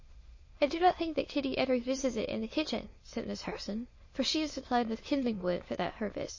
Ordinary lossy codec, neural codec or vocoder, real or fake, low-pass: MP3, 32 kbps; autoencoder, 22.05 kHz, a latent of 192 numbers a frame, VITS, trained on many speakers; fake; 7.2 kHz